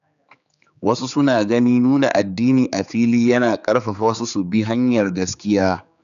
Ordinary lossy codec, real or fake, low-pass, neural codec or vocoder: none; fake; 7.2 kHz; codec, 16 kHz, 4 kbps, X-Codec, HuBERT features, trained on general audio